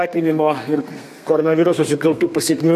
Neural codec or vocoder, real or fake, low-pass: codec, 32 kHz, 1.9 kbps, SNAC; fake; 14.4 kHz